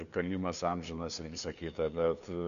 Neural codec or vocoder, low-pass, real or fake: codec, 16 kHz, 2 kbps, FunCodec, trained on LibriTTS, 25 frames a second; 7.2 kHz; fake